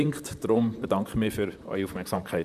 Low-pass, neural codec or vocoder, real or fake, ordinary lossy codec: 14.4 kHz; vocoder, 44.1 kHz, 128 mel bands, Pupu-Vocoder; fake; none